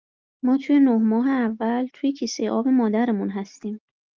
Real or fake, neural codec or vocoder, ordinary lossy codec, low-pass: real; none; Opus, 24 kbps; 7.2 kHz